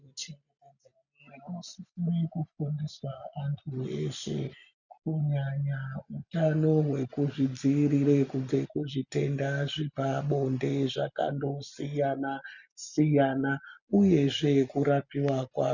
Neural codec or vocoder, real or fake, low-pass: none; real; 7.2 kHz